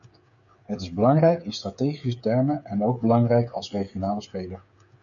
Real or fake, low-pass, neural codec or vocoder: fake; 7.2 kHz; codec, 16 kHz, 8 kbps, FreqCodec, smaller model